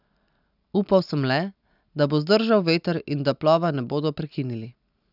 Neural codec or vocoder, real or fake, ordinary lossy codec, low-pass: none; real; none; 5.4 kHz